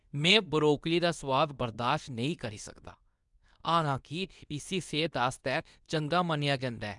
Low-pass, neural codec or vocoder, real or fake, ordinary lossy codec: 10.8 kHz; codec, 24 kHz, 0.9 kbps, WavTokenizer, medium speech release version 2; fake; none